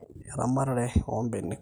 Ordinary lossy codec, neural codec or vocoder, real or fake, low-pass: none; none; real; none